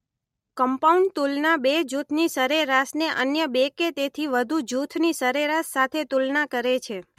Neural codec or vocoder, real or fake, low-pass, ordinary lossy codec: none; real; 19.8 kHz; MP3, 64 kbps